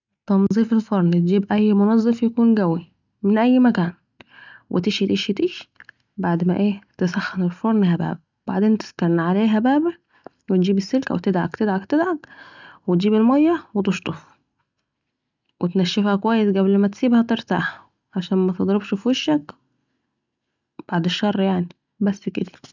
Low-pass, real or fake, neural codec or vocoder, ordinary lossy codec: 7.2 kHz; real; none; none